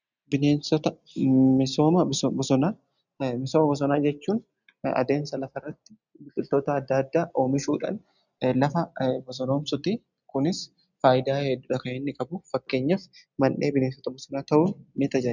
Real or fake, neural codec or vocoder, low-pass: real; none; 7.2 kHz